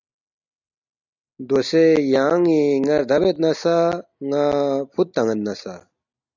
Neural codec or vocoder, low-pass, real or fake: none; 7.2 kHz; real